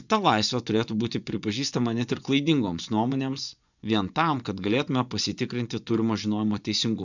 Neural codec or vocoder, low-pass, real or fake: vocoder, 24 kHz, 100 mel bands, Vocos; 7.2 kHz; fake